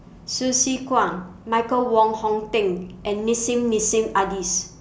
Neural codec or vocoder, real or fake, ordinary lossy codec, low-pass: none; real; none; none